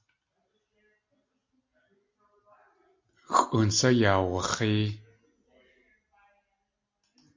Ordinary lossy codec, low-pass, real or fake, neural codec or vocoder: MP3, 48 kbps; 7.2 kHz; real; none